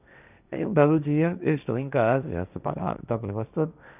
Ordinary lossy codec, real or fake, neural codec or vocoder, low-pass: none; fake; codec, 16 kHz, 1.1 kbps, Voila-Tokenizer; 3.6 kHz